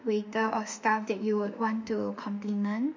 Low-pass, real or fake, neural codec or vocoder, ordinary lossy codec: 7.2 kHz; fake; autoencoder, 48 kHz, 32 numbers a frame, DAC-VAE, trained on Japanese speech; MP3, 64 kbps